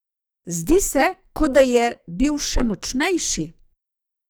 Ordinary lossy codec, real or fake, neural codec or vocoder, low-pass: none; fake; codec, 44.1 kHz, 2.6 kbps, SNAC; none